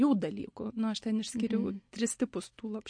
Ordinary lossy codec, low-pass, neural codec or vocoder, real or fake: MP3, 48 kbps; 9.9 kHz; none; real